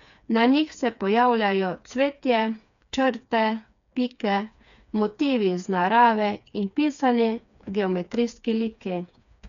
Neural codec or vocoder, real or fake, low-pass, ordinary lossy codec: codec, 16 kHz, 4 kbps, FreqCodec, smaller model; fake; 7.2 kHz; none